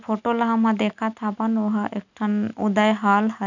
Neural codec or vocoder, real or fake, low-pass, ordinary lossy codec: none; real; 7.2 kHz; none